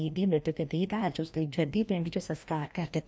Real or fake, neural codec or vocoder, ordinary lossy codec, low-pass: fake; codec, 16 kHz, 1 kbps, FreqCodec, larger model; none; none